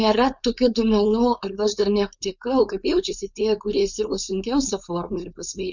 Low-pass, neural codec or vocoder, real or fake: 7.2 kHz; codec, 16 kHz, 4.8 kbps, FACodec; fake